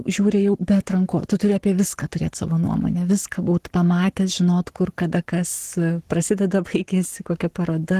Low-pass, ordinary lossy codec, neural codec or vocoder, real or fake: 14.4 kHz; Opus, 16 kbps; codec, 44.1 kHz, 7.8 kbps, Pupu-Codec; fake